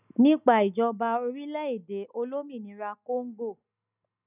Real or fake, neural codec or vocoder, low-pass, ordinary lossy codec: real; none; 3.6 kHz; none